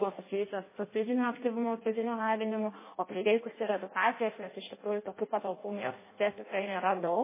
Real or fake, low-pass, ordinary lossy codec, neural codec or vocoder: fake; 3.6 kHz; MP3, 16 kbps; codec, 16 kHz in and 24 kHz out, 0.6 kbps, FireRedTTS-2 codec